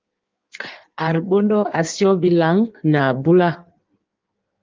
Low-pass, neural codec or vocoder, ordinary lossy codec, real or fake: 7.2 kHz; codec, 16 kHz in and 24 kHz out, 1.1 kbps, FireRedTTS-2 codec; Opus, 32 kbps; fake